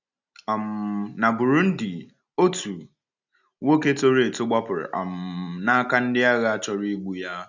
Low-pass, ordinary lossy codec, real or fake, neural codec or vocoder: 7.2 kHz; none; real; none